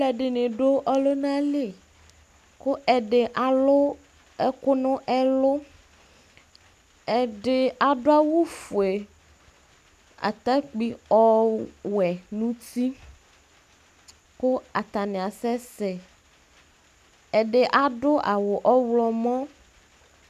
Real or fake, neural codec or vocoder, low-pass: real; none; 14.4 kHz